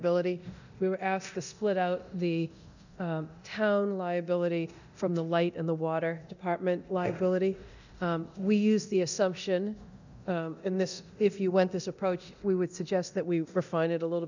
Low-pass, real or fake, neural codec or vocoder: 7.2 kHz; fake; codec, 24 kHz, 0.9 kbps, DualCodec